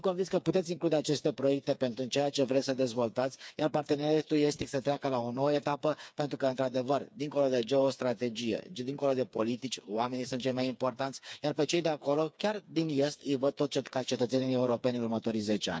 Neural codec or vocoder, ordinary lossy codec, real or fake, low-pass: codec, 16 kHz, 4 kbps, FreqCodec, smaller model; none; fake; none